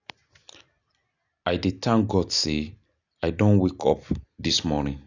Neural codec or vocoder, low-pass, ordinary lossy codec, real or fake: none; 7.2 kHz; none; real